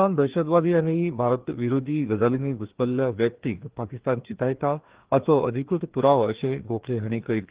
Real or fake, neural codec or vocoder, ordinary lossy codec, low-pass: fake; codec, 16 kHz, 2 kbps, FreqCodec, larger model; Opus, 16 kbps; 3.6 kHz